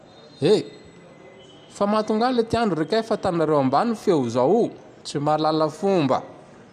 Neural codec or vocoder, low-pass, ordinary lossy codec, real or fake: none; 14.4 kHz; none; real